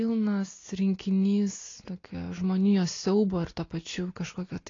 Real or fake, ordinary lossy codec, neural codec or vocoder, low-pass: real; AAC, 32 kbps; none; 7.2 kHz